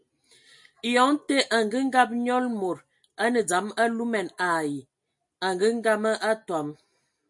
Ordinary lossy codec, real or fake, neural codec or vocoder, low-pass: MP3, 48 kbps; real; none; 10.8 kHz